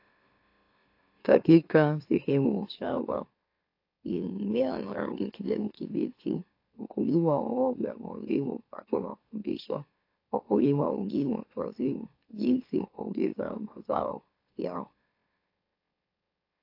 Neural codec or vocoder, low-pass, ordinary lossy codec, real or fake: autoencoder, 44.1 kHz, a latent of 192 numbers a frame, MeloTTS; 5.4 kHz; AAC, 32 kbps; fake